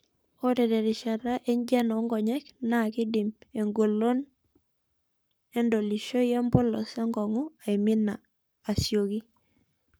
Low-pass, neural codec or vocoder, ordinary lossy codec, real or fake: none; codec, 44.1 kHz, 7.8 kbps, Pupu-Codec; none; fake